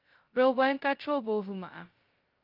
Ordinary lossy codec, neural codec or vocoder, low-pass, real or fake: Opus, 24 kbps; codec, 16 kHz, 0.2 kbps, FocalCodec; 5.4 kHz; fake